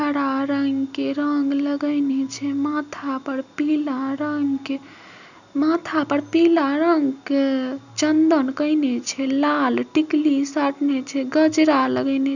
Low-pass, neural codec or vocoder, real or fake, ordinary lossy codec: 7.2 kHz; none; real; none